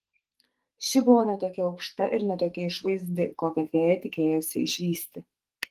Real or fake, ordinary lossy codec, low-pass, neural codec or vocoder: fake; Opus, 24 kbps; 14.4 kHz; codec, 44.1 kHz, 2.6 kbps, SNAC